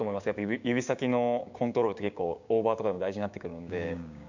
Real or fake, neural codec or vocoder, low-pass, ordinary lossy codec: fake; codec, 16 kHz, 6 kbps, DAC; 7.2 kHz; none